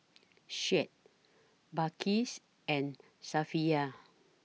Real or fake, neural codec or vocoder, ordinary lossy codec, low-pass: real; none; none; none